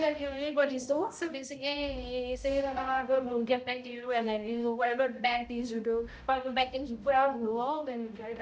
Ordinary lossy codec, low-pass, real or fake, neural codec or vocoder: none; none; fake; codec, 16 kHz, 0.5 kbps, X-Codec, HuBERT features, trained on balanced general audio